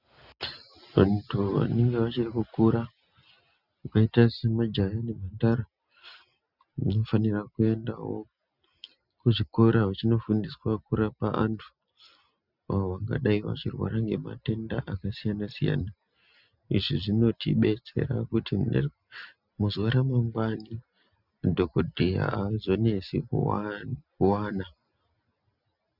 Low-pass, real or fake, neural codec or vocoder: 5.4 kHz; real; none